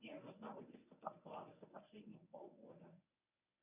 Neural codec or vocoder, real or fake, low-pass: codec, 24 kHz, 0.9 kbps, WavTokenizer, medium speech release version 1; fake; 3.6 kHz